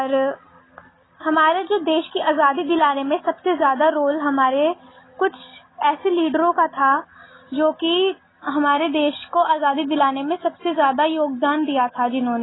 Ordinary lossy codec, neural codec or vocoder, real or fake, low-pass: AAC, 16 kbps; none; real; 7.2 kHz